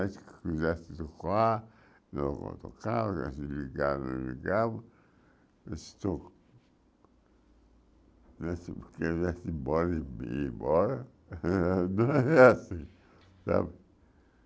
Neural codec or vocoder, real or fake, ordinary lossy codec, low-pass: none; real; none; none